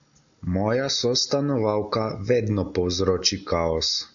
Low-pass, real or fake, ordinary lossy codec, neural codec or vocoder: 7.2 kHz; real; AAC, 64 kbps; none